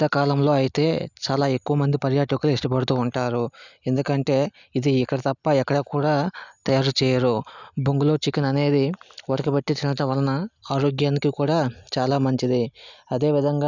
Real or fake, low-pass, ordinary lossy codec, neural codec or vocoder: real; 7.2 kHz; none; none